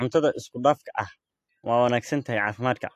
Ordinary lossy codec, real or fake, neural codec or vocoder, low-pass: AAC, 64 kbps; real; none; 14.4 kHz